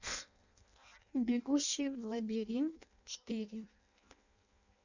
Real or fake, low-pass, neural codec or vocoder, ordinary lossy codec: fake; 7.2 kHz; codec, 16 kHz in and 24 kHz out, 0.6 kbps, FireRedTTS-2 codec; none